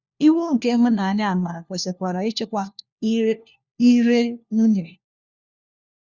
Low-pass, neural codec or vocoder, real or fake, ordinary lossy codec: 7.2 kHz; codec, 16 kHz, 1 kbps, FunCodec, trained on LibriTTS, 50 frames a second; fake; Opus, 64 kbps